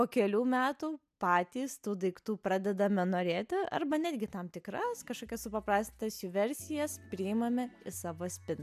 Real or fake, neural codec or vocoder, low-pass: real; none; 14.4 kHz